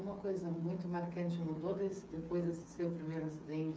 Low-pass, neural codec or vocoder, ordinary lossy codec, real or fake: none; codec, 16 kHz, 8 kbps, FreqCodec, smaller model; none; fake